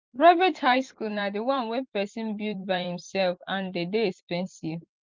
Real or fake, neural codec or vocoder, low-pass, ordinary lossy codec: fake; vocoder, 44.1 kHz, 128 mel bands every 512 samples, BigVGAN v2; 7.2 kHz; Opus, 24 kbps